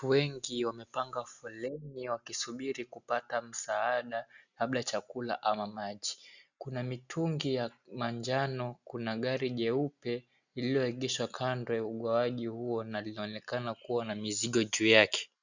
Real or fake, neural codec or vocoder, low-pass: real; none; 7.2 kHz